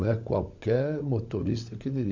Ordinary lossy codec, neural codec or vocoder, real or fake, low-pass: MP3, 64 kbps; codec, 16 kHz, 16 kbps, FunCodec, trained on LibriTTS, 50 frames a second; fake; 7.2 kHz